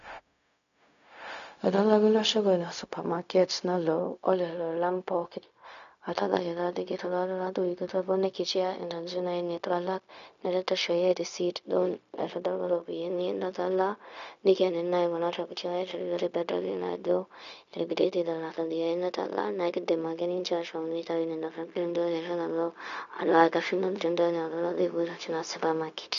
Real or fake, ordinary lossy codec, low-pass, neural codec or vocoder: fake; none; 7.2 kHz; codec, 16 kHz, 0.4 kbps, LongCat-Audio-Codec